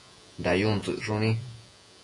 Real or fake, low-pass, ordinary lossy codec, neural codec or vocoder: fake; 10.8 kHz; AAC, 64 kbps; vocoder, 48 kHz, 128 mel bands, Vocos